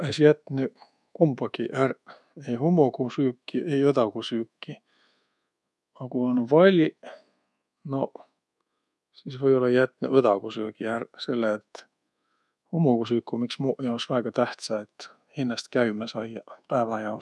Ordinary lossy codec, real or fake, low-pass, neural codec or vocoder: none; fake; none; codec, 24 kHz, 1.2 kbps, DualCodec